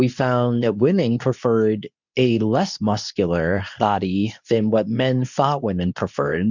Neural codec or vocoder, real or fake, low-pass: codec, 24 kHz, 0.9 kbps, WavTokenizer, medium speech release version 2; fake; 7.2 kHz